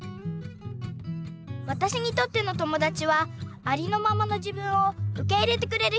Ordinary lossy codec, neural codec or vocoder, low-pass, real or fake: none; none; none; real